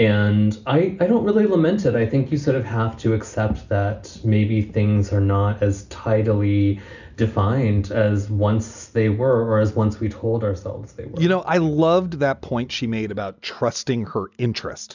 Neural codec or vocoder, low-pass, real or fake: none; 7.2 kHz; real